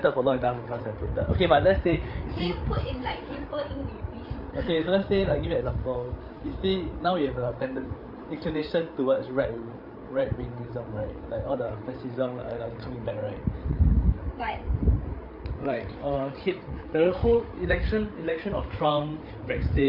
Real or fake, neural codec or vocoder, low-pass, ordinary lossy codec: fake; codec, 16 kHz, 8 kbps, FreqCodec, larger model; 5.4 kHz; MP3, 48 kbps